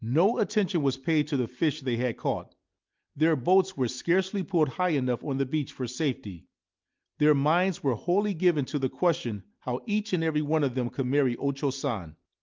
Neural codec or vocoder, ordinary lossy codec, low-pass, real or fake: none; Opus, 24 kbps; 7.2 kHz; real